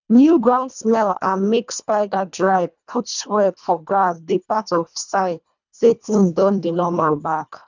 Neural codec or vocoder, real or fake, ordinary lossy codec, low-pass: codec, 24 kHz, 1.5 kbps, HILCodec; fake; none; 7.2 kHz